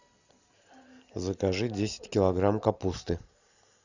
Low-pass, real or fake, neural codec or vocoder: 7.2 kHz; real; none